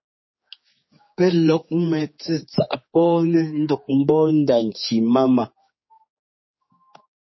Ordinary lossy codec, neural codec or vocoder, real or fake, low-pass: MP3, 24 kbps; codec, 16 kHz, 4 kbps, X-Codec, HuBERT features, trained on general audio; fake; 7.2 kHz